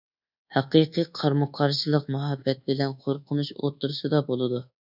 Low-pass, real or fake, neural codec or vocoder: 5.4 kHz; fake; codec, 24 kHz, 1.2 kbps, DualCodec